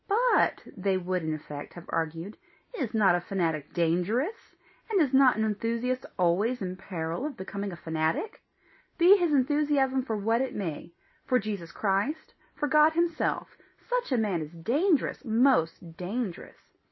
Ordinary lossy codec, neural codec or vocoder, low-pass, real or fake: MP3, 24 kbps; none; 7.2 kHz; real